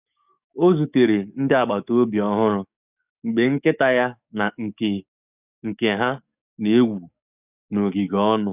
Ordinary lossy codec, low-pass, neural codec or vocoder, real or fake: none; 3.6 kHz; codec, 44.1 kHz, 7.8 kbps, DAC; fake